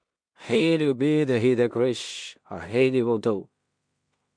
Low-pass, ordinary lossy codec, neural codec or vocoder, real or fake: 9.9 kHz; MP3, 64 kbps; codec, 16 kHz in and 24 kHz out, 0.4 kbps, LongCat-Audio-Codec, two codebook decoder; fake